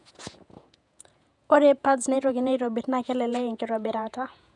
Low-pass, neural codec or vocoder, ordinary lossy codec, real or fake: 10.8 kHz; vocoder, 48 kHz, 128 mel bands, Vocos; none; fake